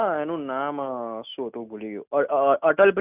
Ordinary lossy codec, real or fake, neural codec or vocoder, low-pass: none; real; none; 3.6 kHz